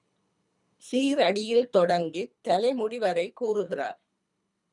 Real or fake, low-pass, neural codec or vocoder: fake; 10.8 kHz; codec, 24 kHz, 3 kbps, HILCodec